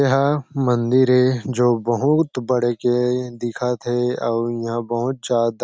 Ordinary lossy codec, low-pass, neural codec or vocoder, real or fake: none; none; none; real